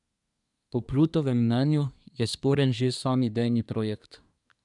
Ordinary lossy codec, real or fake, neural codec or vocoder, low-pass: none; fake; codec, 24 kHz, 1 kbps, SNAC; 10.8 kHz